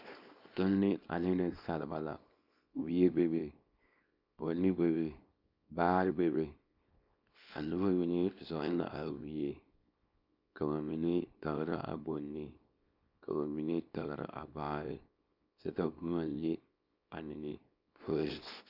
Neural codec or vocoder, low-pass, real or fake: codec, 24 kHz, 0.9 kbps, WavTokenizer, small release; 5.4 kHz; fake